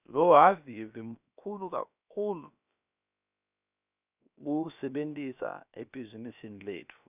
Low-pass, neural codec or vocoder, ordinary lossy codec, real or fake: 3.6 kHz; codec, 16 kHz, about 1 kbps, DyCAST, with the encoder's durations; none; fake